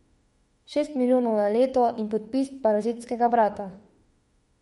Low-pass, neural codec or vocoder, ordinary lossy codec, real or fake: 19.8 kHz; autoencoder, 48 kHz, 32 numbers a frame, DAC-VAE, trained on Japanese speech; MP3, 48 kbps; fake